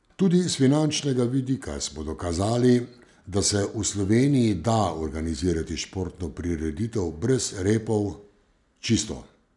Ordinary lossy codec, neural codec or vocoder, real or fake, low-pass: none; none; real; 10.8 kHz